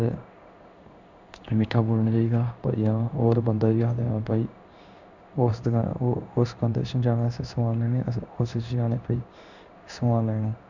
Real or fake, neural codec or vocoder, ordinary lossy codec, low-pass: fake; codec, 16 kHz in and 24 kHz out, 1 kbps, XY-Tokenizer; none; 7.2 kHz